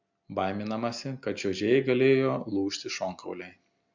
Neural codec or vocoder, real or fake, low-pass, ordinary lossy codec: none; real; 7.2 kHz; MP3, 64 kbps